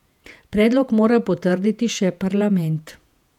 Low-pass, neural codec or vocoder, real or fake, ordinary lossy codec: 19.8 kHz; vocoder, 48 kHz, 128 mel bands, Vocos; fake; none